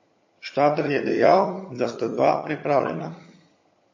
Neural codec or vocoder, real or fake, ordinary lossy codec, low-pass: vocoder, 22.05 kHz, 80 mel bands, HiFi-GAN; fake; MP3, 32 kbps; 7.2 kHz